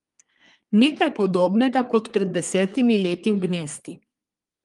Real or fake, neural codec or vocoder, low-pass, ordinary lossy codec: fake; codec, 24 kHz, 1 kbps, SNAC; 10.8 kHz; Opus, 32 kbps